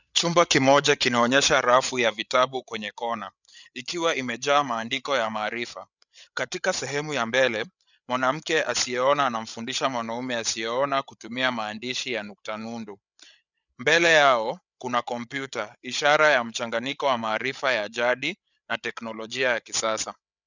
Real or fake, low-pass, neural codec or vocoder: fake; 7.2 kHz; codec, 16 kHz, 8 kbps, FreqCodec, larger model